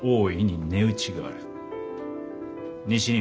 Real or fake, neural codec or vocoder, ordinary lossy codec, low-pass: real; none; none; none